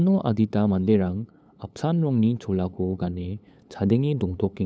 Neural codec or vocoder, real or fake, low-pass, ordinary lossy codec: codec, 16 kHz, 8 kbps, FunCodec, trained on LibriTTS, 25 frames a second; fake; none; none